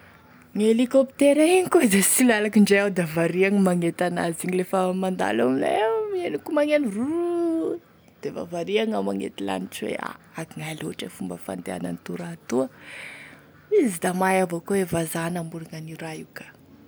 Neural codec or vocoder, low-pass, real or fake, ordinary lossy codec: none; none; real; none